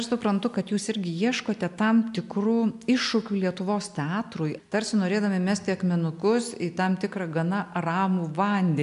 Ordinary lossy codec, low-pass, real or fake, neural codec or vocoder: MP3, 96 kbps; 10.8 kHz; real; none